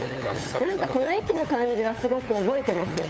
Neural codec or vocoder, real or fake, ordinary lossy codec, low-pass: codec, 16 kHz, 8 kbps, FunCodec, trained on LibriTTS, 25 frames a second; fake; none; none